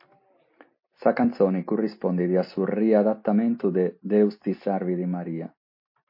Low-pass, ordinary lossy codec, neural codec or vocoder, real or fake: 5.4 kHz; MP3, 32 kbps; none; real